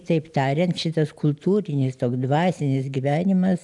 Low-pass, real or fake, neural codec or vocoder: 10.8 kHz; real; none